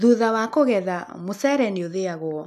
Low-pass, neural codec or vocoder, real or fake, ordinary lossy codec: 14.4 kHz; none; real; none